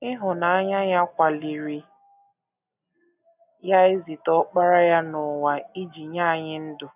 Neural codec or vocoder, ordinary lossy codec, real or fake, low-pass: none; none; real; 3.6 kHz